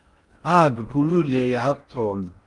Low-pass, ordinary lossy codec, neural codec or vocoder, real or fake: 10.8 kHz; Opus, 32 kbps; codec, 16 kHz in and 24 kHz out, 0.6 kbps, FocalCodec, streaming, 4096 codes; fake